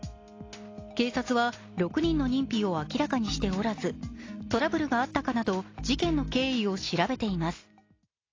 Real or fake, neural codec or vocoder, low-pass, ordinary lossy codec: real; none; 7.2 kHz; AAC, 32 kbps